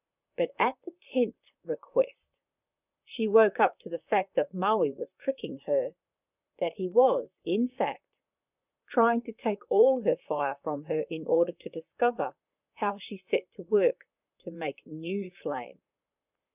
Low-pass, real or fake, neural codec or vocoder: 3.6 kHz; fake; vocoder, 44.1 kHz, 128 mel bands, Pupu-Vocoder